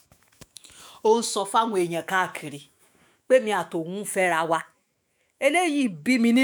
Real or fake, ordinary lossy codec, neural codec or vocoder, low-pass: fake; none; autoencoder, 48 kHz, 128 numbers a frame, DAC-VAE, trained on Japanese speech; none